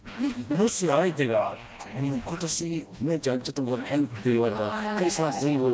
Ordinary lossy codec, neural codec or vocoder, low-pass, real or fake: none; codec, 16 kHz, 1 kbps, FreqCodec, smaller model; none; fake